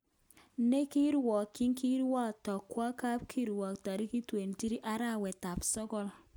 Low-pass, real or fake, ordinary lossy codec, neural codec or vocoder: none; real; none; none